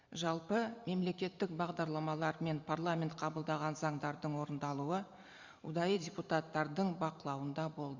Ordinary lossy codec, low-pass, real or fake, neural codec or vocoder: Opus, 64 kbps; 7.2 kHz; fake; vocoder, 44.1 kHz, 128 mel bands every 256 samples, BigVGAN v2